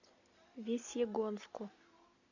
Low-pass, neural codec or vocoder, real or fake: 7.2 kHz; none; real